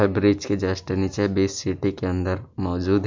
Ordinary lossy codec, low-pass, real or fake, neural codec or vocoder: MP3, 64 kbps; 7.2 kHz; real; none